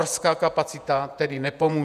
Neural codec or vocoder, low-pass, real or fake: vocoder, 48 kHz, 128 mel bands, Vocos; 14.4 kHz; fake